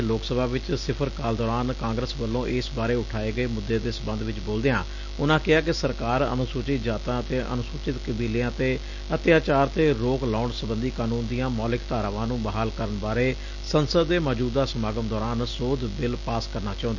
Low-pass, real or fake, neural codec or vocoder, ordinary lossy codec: 7.2 kHz; real; none; none